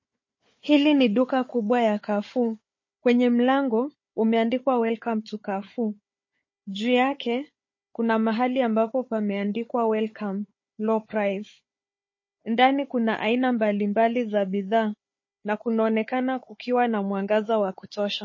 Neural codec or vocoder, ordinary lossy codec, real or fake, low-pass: codec, 16 kHz, 4 kbps, FunCodec, trained on Chinese and English, 50 frames a second; MP3, 32 kbps; fake; 7.2 kHz